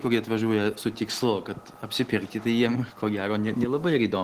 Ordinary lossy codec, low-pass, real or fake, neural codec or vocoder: Opus, 16 kbps; 14.4 kHz; real; none